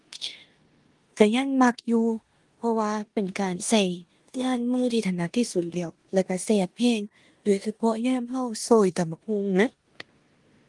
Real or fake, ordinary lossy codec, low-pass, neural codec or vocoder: fake; Opus, 24 kbps; 10.8 kHz; codec, 16 kHz in and 24 kHz out, 0.9 kbps, LongCat-Audio-Codec, four codebook decoder